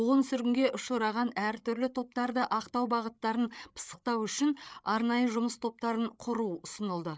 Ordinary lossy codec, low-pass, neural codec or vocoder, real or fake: none; none; codec, 16 kHz, 8 kbps, FreqCodec, larger model; fake